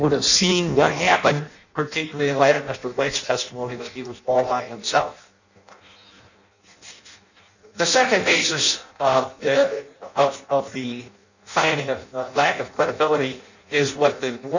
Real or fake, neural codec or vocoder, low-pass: fake; codec, 16 kHz in and 24 kHz out, 0.6 kbps, FireRedTTS-2 codec; 7.2 kHz